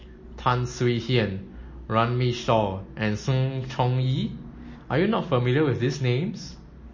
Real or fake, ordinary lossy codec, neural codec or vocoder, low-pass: real; MP3, 32 kbps; none; 7.2 kHz